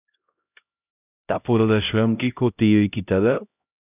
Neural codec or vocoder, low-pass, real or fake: codec, 16 kHz, 0.5 kbps, X-Codec, HuBERT features, trained on LibriSpeech; 3.6 kHz; fake